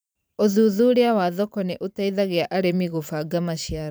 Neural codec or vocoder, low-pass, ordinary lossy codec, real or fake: vocoder, 44.1 kHz, 128 mel bands every 512 samples, BigVGAN v2; none; none; fake